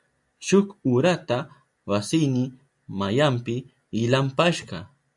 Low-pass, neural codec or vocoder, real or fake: 10.8 kHz; none; real